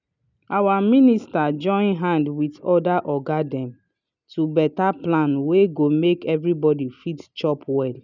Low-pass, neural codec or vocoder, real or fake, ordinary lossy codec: 7.2 kHz; none; real; none